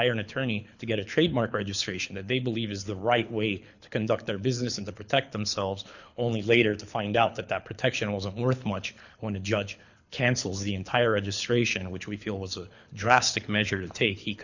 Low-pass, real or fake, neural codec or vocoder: 7.2 kHz; fake; codec, 24 kHz, 6 kbps, HILCodec